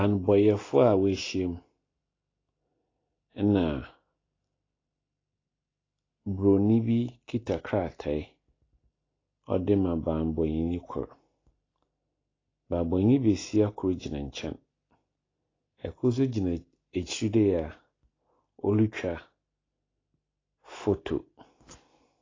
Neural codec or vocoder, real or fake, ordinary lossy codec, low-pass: none; real; AAC, 32 kbps; 7.2 kHz